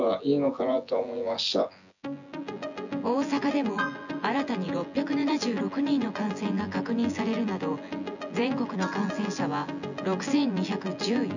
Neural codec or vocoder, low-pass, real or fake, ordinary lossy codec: vocoder, 24 kHz, 100 mel bands, Vocos; 7.2 kHz; fake; none